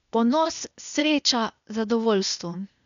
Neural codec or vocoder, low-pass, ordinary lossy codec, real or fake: codec, 16 kHz, 0.8 kbps, ZipCodec; 7.2 kHz; none; fake